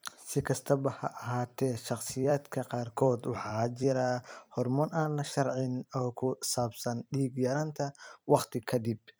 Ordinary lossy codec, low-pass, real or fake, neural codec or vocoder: none; none; fake; vocoder, 44.1 kHz, 128 mel bands every 256 samples, BigVGAN v2